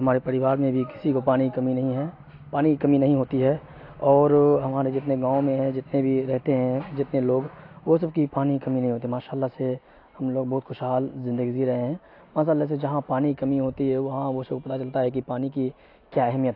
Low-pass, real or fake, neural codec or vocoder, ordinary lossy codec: 5.4 kHz; real; none; AAC, 32 kbps